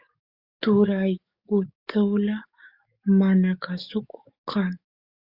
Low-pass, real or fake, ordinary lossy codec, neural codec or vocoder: 5.4 kHz; fake; Opus, 64 kbps; codec, 44.1 kHz, 7.8 kbps, DAC